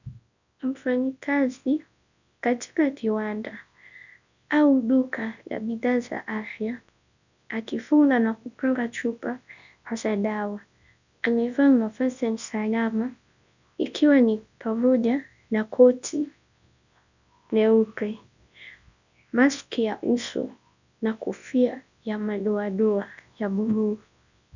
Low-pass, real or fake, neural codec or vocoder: 7.2 kHz; fake; codec, 24 kHz, 0.9 kbps, WavTokenizer, large speech release